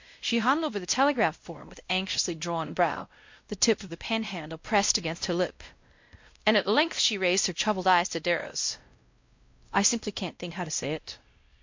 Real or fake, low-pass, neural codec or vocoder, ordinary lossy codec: fake; 7.2 kHz; codec, 16 kHz, 0.5 kbps, X-Codec, WavLM features, trained on Multilingual LibriSpeech; MP3, 48 kbps